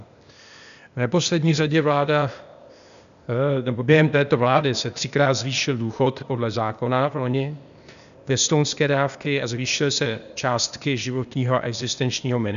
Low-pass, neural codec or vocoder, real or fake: 7.2 kHz; codec, 16 kHz, 0.8 kbps, ZipCodec; fake